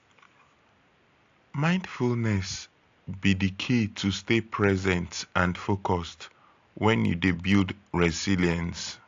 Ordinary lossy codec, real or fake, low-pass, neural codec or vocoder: AAC, 48 kbps; real; 7.2 kHz; none